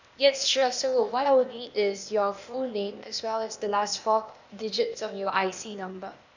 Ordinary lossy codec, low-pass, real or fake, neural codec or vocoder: none; 7.2 kHz; fake; codec, 16 kHz, 0.8 kbps, ZipCodec